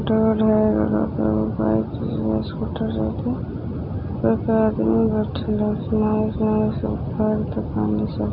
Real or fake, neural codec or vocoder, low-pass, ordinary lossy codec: real; none; 5.4 kHz; none